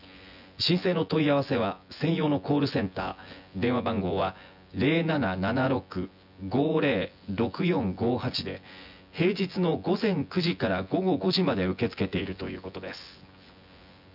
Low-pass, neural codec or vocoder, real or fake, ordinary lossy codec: 5.4 kHz; vocoder, 24 kHz, 100 mel bands, Vocos; fake; none